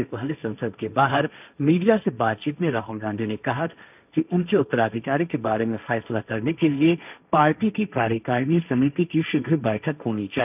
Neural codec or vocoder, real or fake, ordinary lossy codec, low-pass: codec, 16 kHz, 1.1 kbps, Voila-Tokenizer; fake; none; 3.6 kHz